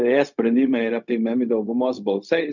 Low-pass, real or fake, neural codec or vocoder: 7.2 kHz; fake; codec, 16 kHz, 0.4 kbps, LongCat-Audio-Codec